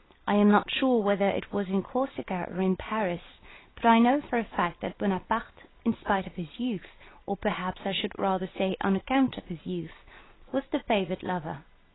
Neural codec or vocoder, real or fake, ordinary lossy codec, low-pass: none; real; AAC, 16 kbps; 7.2 kHz